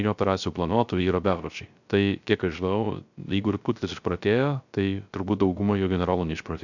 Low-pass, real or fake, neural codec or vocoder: 7.2 kHz; fake; codec, 16 kHz, 0.3 kbps, FocalCodec